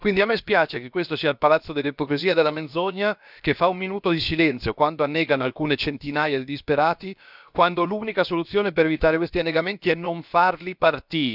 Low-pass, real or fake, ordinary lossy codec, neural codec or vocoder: 5.4 kHz; fake; none; codec, 16 kHz, about 1 kbps, DyCAST, with the encoder's durations